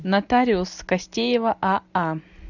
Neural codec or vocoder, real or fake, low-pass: none; real; 7.2 kHz